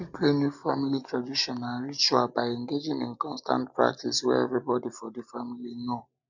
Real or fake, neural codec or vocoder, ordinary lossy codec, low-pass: real; none; none; 7.2 kHz